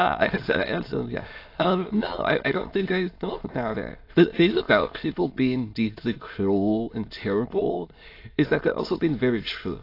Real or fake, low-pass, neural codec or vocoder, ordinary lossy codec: fake; 5.4 kHz; autoencoder, 22.05 kHz, a latent of 192 numbers a frame, VITS, trained on many speakers; AAC, 32 kbps